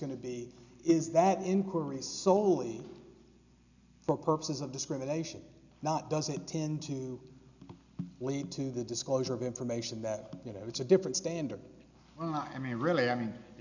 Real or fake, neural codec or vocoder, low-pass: real; none; 7.2 kHz